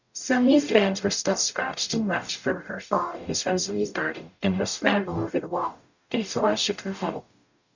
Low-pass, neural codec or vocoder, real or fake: 7.2 kHz; codec, 44.1 kHz, 0.9 kbps, DAC; fake